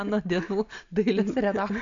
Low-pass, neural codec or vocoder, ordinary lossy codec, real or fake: 7.2 kHz; none; AAC, 64 kbps; real